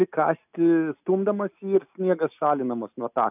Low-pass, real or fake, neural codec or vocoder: 3.6 kHz; real; none